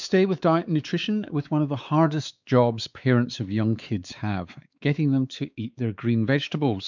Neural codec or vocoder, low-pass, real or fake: codec, 16 kHz, 4 kbps, X-Codec, WavLM features, trained on Multilingual LibriSpeech; 7.2 kHz; fake